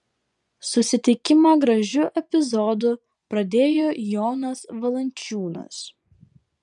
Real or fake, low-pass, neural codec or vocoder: fake; 10.8 kHz; vocoder, 24 kHz, 100 mel bands, Vocos